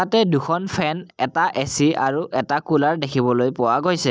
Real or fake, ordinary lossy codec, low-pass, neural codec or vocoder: real; none; none; none